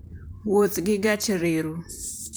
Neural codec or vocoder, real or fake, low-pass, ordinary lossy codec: vocoder, 44.1 kHz, 128 mel bands, Pupu-Vocoder; fake; none; none